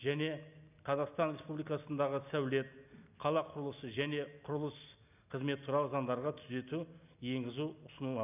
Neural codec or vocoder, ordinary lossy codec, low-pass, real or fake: none; none; 3.6 kHz; real